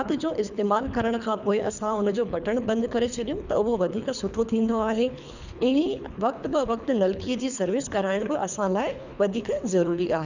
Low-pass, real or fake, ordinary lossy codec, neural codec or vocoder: 7.2 kHz; fake; none; codec, 24 kHz, 3 kbps, HILCodec